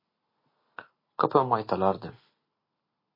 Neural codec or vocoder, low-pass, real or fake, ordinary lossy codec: none; 5.4 kHz; real; MP3, 24 kbps